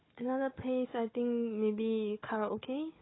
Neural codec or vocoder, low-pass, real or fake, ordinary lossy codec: codec, 16 kHz, 4 kbps, FunCodec, trained on Chinese and English, 50 frames a second; 7.2 kHz; fake; AAC, 16 kbps